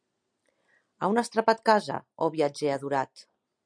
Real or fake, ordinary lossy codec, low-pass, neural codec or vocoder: real; MP3, 96 kbps; 9.9 kHz; none